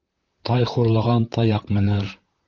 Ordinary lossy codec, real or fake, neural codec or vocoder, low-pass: Opus, 32 kbps; fake; vocoder, 44.1 kHz, 128 mel bands, Pupu-Vocoder; 7.2 kHz